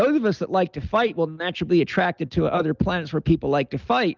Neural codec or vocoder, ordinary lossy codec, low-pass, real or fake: none; Opus, 32 kbps; 7.2 kHz; real